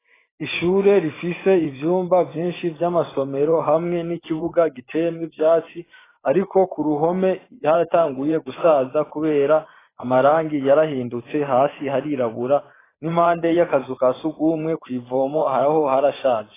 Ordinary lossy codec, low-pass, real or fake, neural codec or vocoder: AAC, 16 kbps; 3.6 kHz; fake; vocoder, 44.1 kHz, 128 mel bands every 512 samples, BigVGAN v2